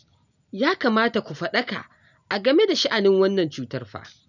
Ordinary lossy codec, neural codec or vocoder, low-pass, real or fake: none; none; 7.2 kHz; real